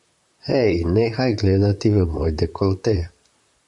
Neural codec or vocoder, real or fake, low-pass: vocoder, 44.1 kHz, 128 mel bands, Pupu-Vocoder; fake; 10.8 kHz